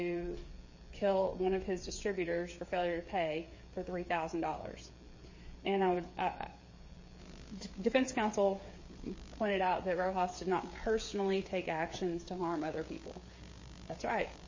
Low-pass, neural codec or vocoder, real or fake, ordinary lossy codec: 7.2 kHz; codec, 16 kHz, 8 kbps, FreqCodec, smaller model; fake; MP3, 32 kbps